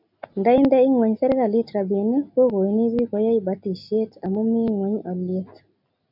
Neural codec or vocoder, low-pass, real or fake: none; 5.4 kHz; real